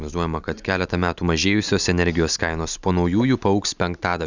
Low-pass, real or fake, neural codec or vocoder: 7.2 kHz; real; none